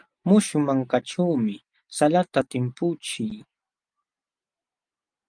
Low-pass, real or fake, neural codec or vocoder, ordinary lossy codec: 9.9 kHz; real; none; Opus, 32 kbps